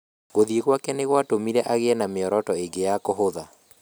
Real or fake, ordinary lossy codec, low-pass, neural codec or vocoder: real; none; none; none